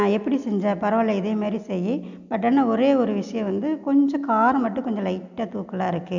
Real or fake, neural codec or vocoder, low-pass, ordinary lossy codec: real; none; 7.2 kHz; none